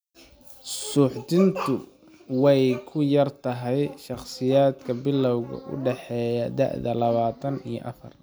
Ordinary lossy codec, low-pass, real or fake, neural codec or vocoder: none; none; real; none